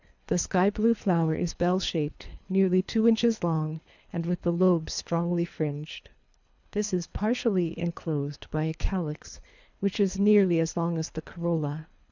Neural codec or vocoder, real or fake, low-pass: codec, 24 kHz, 3 kbps, HILCodec; fake; 7.2 kHz